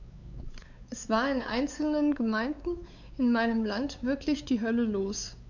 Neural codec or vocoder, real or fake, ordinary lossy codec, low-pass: codec, 16 kHz, 4 kbps, X-Codec, WavLM features, trained on Multilingual LibriSpeech; fake; none; 7.2 kHz